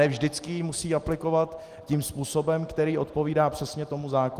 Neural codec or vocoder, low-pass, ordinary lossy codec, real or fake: none; 14.4 kHz; Opus, 24 kbps; real